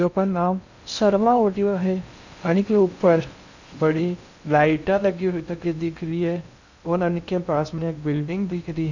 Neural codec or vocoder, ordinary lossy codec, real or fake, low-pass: codec, 16 kHz in and 24 kHz out, 0.6 kbps, FocalCodec, streaming, 2048 codes; none; fake; 7.2 kHz